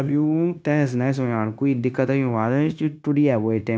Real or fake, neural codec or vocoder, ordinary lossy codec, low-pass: fake; codec, 16 kHz, 0.9 kbps, LongCat-Audio-Codec; none; none